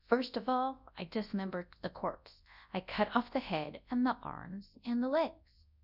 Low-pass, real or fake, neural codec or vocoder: 5.4 kHz; fake; codec, 24 kHz, 0.9 kbps, WavTokenizer, large speech release